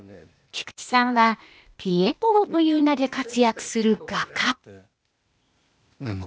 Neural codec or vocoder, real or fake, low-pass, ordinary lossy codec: codec, 16 kHz, 0.8 kbps, ZipCodec; fake; none; none